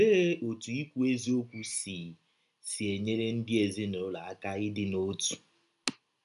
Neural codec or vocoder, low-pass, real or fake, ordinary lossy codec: none; 10.8 kHz; real; none